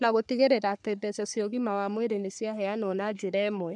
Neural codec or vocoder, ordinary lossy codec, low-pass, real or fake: codec, 44.1 kHz, 3.4 kbps, Pupu-Codec; none; 10.8 kHz; fake